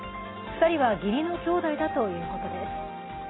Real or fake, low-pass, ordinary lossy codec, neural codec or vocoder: real; 7.2 kHz; AAC, 16 kbps; none